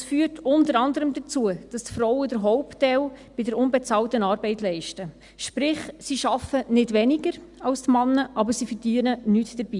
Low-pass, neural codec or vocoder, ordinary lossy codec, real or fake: 10.8 kHz; none; Opus, 64 kbps; real